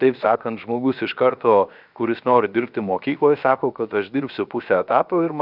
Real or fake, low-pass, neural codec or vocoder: fake; 5.4 kHz; codec, 16 kHz, about 1 kbps, DyCAST, with the encoder's durations